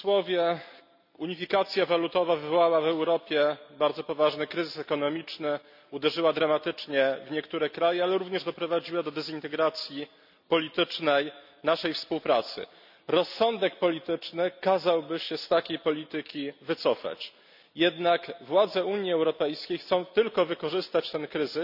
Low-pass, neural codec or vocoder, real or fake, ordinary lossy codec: 5.4 kHz; none; real; none